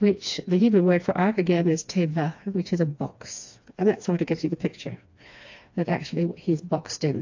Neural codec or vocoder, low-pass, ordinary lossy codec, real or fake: codec, 16 kHz, 2 kbps, FreqCodec, smaller model; 7.2 kHz; AAC, 48 kbps; fake